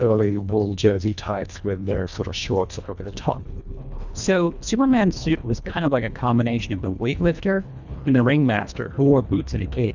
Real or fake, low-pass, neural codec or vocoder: fake; 7.2 kHz; codec, 24 kHz, 1.5 kbps, HILCodec